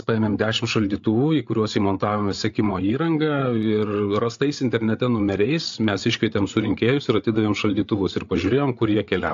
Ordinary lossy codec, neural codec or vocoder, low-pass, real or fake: AAC, 64 kbps; codec, 16 kHz, 8 kbps, FreqCodec, larger model; 7.2 kHz; fake